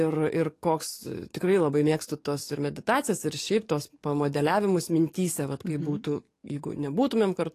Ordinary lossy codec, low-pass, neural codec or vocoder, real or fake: AAC, 48 kbps; 14.4 kHz; codec, 44.1 kHz, 7.8 kbps, DAC; fake